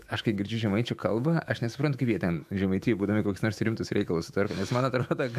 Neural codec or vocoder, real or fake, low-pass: autoencoder, 48 kHz, 128 numbers a frame, DAC-VAE, trained on Japanese speech; fake; 14.4 kHz